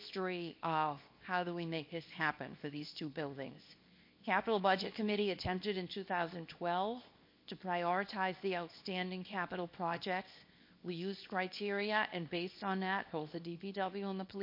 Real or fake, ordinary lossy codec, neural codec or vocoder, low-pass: fake; MP3, 32 kbps; codec, 24 kHz, 0.9 kbps, WavTokenizer, small release; 5.4 kHz